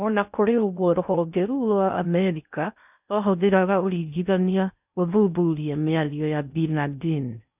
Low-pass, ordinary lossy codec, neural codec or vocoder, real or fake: 3.6 kHz; none; codec, 16 kHz in and 24 kHz out, 0.6 kbps, FocalCodec, streaming, 4096 codes; fake